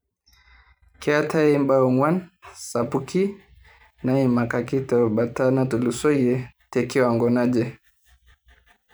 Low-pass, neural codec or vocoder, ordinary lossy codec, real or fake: none; vocoder, 44.1 kHz, 128 mel bands every 512 samples, BigVGAN v2; none; fake